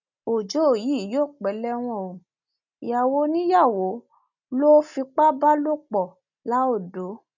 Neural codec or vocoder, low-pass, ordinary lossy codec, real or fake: none; 7.2 kHz; none; real